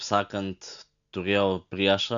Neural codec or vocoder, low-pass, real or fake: none; 7.2 kHz; real